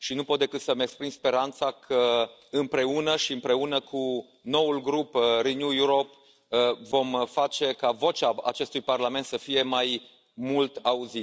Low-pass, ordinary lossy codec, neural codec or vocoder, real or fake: none; none; none; real